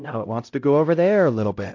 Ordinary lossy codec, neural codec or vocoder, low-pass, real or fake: AAC, 48 kbps; codec, 16 kHz, 0.5 kbps, X-Codec, HuBERT features, trained on LibriSpeech; 7.2 kHz; fake